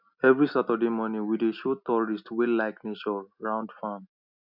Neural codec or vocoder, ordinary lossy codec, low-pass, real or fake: none; none; 5.4 kHz; real